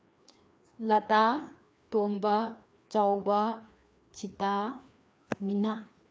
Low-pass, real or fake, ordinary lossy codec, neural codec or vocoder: none; fake; none; codec, 16 kHz, 2 kbps, FreqCodec, larger model